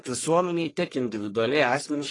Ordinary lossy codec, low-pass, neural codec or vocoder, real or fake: AAC, 32 kbps; 10.8 kHz; codec, 44.1 kHz, 1.7 kbps, Pupu-Codec; fake